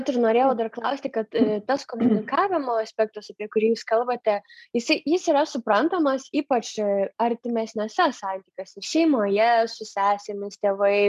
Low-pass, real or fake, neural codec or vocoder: 14.4 kHz; real; none